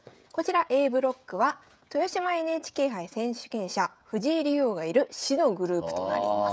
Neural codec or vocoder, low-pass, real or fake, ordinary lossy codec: codec, 16 kHz, 16 kbps, FreqCodec, smaller model; none; fake; none